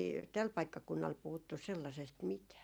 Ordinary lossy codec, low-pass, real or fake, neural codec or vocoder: none; none; fake; vocoder, 44.1 kHz, 128 mel bands every 512 samples, BigVGAN v2